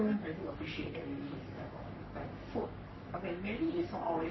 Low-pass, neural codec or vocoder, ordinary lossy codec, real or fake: 7.2 kHz; codec, 44.1 kHz, 3.4 kbps, Pupu-Codec; MP3, 24 kbps; fake